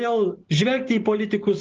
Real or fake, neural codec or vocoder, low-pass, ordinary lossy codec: real; none; 7.2 kHz; Opus, 16 kbps